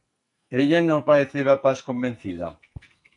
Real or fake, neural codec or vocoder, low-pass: fake; codec, 44.1 kHz, 2.6 kbps, SNAC; 10.8 kHz